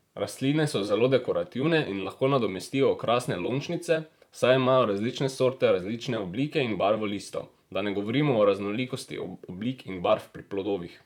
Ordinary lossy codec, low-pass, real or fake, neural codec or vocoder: none; 19.8 kHz; fake; vocoder, 44.1 kHz, 128 mel bands, Pupu-Vocoder